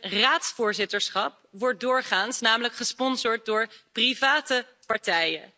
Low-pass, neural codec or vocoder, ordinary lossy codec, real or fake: none; none; none; real